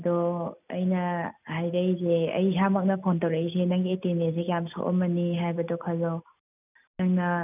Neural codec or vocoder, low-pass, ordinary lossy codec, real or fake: none; 3.6 kHz; none; real